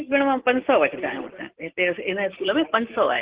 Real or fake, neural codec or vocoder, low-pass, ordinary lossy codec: real; none; 3.6 kHz; Opus, 16 kbps